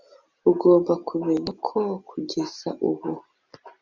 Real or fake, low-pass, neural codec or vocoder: real; 7.2 kHz; none